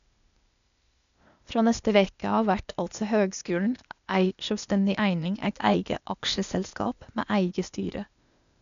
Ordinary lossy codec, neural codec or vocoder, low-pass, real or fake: MP3, 96 kbps; codec, 16 kHz, 0.8 kbps, ZipCodec; 7.2 kHz; fake